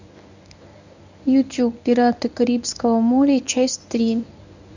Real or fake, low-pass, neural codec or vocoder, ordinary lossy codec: fake; 7.2 kHz; codec, 24 kHz, 0.9 kbps, WavTokenizer, medium speech release version 1; none